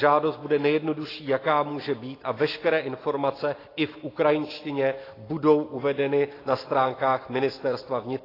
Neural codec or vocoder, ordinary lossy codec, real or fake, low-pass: none; AAC, 24 kbps; real; 5.4 kHz